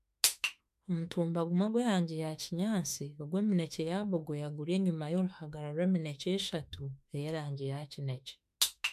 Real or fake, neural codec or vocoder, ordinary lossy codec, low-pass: fake; autoencoder, 48 kHz, 32 numbers a frame, DAC-VAE, trained on Japanese speech; MP3, 96 kbps; 14.4 kHz